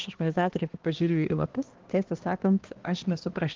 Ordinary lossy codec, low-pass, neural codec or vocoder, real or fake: Opus, 16 kbps; 7.2 kHz; codec, 16 kHz, 1 kbps, X-Codec, HuBERT features, trained on balanced general audio; fake